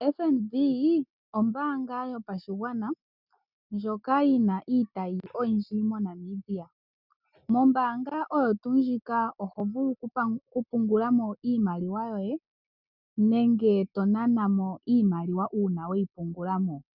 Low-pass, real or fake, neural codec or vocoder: 5.4 kHz; real; none